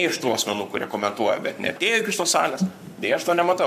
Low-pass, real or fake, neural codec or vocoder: 14.4 kHz; fake; codec, 44.1 kHz, 7.8 kbps, Pupu-Codec